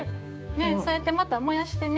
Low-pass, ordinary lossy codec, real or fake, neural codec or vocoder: none; none; fake; codec, 16 kHz, 6 kbps, DAC